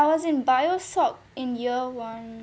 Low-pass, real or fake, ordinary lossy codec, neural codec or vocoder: none; real; none; none